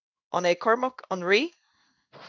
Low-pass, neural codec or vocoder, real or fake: 7.2 kHz; codec, 16 kHz in and 24 kHz out, 1 kbps, XY-Tokenizer; fake